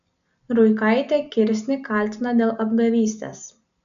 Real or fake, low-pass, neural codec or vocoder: real; 7.2 kHz; none